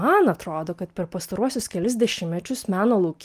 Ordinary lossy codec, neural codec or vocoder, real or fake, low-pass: Opus, 32 kbps; none; real; 14.4 kHz